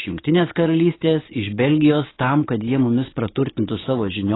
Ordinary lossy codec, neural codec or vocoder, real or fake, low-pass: AAC, 16 kbps; codec, 44.1 kHz, 7.8 kbps, DAC; fake; 7.2 kHz